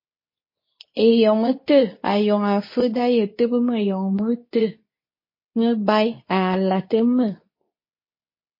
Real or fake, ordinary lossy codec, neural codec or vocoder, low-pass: fake; MP3, 24 kbps; codec, 24 kHz, 0.9 kbps, WavTokenizer, medium speech release version 2; 5.4 kHz